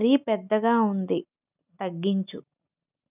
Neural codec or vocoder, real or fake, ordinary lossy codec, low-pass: none; real; none; 3.6 kHz